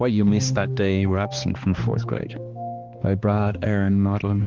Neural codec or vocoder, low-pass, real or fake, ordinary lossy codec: codec, 16 kHz, 2 kbps, X-Codec, HuBERT features, trained on balanced general audio; 7.2 kHz; fake; Opus, 16 kbps